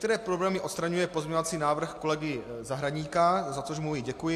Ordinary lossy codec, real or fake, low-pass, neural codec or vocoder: AAC, 64 kbps; real; 14.4 kHz; none